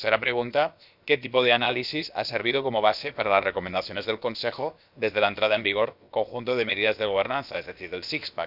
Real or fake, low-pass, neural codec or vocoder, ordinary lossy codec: fake; 5.4 kHz; codec, 16 kHz, about 1 kbps, DyCAST, with the encoder's durations; none